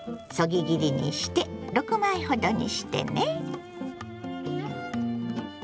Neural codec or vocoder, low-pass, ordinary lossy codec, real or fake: none; none; none; real